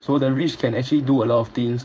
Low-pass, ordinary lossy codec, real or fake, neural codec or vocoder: none; none; fake; codec, 16 kHz, 4.8 kbps, FACodec